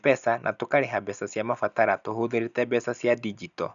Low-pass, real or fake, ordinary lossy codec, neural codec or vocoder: 7.2 kHz; real; none; none